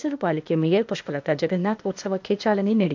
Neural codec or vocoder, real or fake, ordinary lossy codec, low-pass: codec, 16 kHz, 0.8 kbps, ZipCodec; fake; MP3, 64 kbps; 7.2 kHz